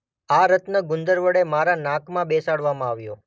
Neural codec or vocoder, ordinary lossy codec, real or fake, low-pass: none; none; real; none